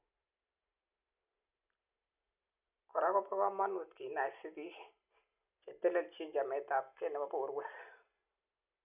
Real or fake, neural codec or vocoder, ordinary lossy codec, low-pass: real; none; none; 3.6 kHz